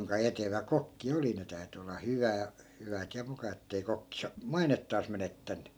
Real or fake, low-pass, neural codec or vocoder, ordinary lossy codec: real; none; none; none